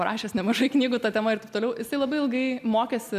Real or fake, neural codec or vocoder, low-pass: real; none; 14.4 kHz